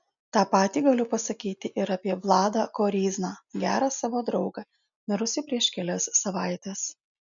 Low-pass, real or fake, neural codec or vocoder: 7.2 kHz; real; none